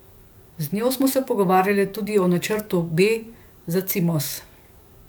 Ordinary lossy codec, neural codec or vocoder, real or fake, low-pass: none; codec, 44.1 kHz, 7.8 kbps, DAC; fake; none